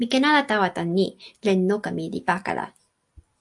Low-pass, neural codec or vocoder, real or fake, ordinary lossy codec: 10.8 kHz; none; real; AAC, 64 kbps